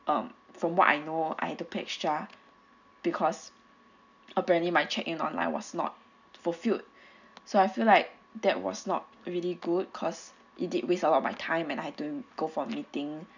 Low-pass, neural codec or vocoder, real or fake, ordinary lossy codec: 7.2 kHz; none; real; none